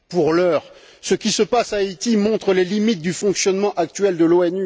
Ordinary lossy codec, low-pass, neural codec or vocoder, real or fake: none; none; none; real